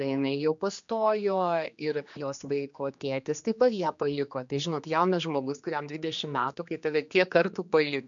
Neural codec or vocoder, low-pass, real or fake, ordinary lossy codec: codec, 16 kHz, 2 kbps, X-Codec, HuBERT features, trained on general audio; 7.2 kHz; fake; MP3, 64 kbps